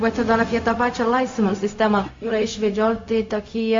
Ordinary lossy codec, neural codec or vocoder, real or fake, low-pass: MP3, 48 kbps; codec, 16 kHz, 0.4 kbps, LongCat-Audio-Codec; fake; 7.2 kHz